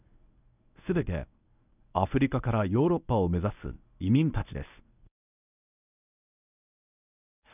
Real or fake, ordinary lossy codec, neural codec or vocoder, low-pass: fake; none; codec, 24 kHz, 0.9 kbps, WavTokenizer, medium speech release version 1; 3.6 kHz